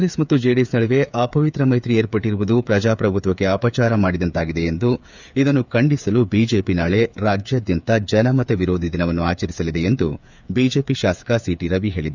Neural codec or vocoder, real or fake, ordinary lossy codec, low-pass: codec, 16 kHz, 8 kbps, FreqCodec, smaller model; fake; none; 7.2 kHz